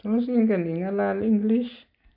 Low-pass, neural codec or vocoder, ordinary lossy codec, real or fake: 5.4 kHz; none; AAC, 48 kbps; real